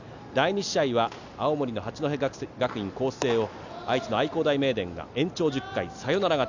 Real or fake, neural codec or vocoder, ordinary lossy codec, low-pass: real; none; none; 7.2 kHz